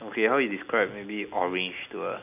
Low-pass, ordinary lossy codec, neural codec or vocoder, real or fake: 3.6 kHz; none; none; real